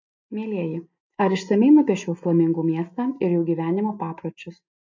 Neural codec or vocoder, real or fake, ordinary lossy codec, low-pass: none; real; MP3, 48 kbps; 7.2 kHz